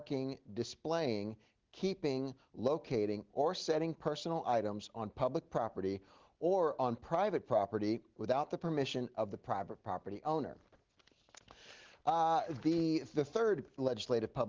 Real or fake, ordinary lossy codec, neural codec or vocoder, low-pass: real; Opus, 16 kbps; none; 7.2 kHz